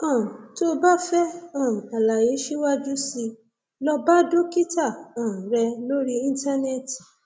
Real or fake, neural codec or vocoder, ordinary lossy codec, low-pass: real; none; none; none